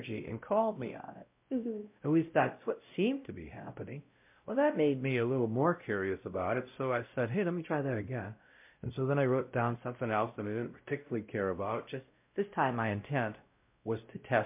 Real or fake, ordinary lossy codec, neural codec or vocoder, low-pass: fake; MP3, 32 kbps; codec, 16 kHz, 0.5 kbps, X-Codec, WavLM features, trained on Multilingual LibriSpeech; 3.6 kHz